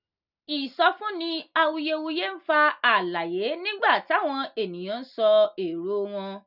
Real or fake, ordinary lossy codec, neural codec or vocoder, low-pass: real; none; none; 5.4 kHz